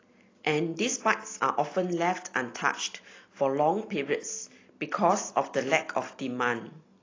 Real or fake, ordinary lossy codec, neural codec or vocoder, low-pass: real; AAC, 32 kbps; none; 7.2 kHz